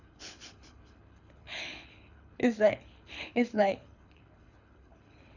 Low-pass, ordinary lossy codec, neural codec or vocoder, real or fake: 7.2 kHz; none; codec, 24 kHz, 6 kbps, HILCodec; fake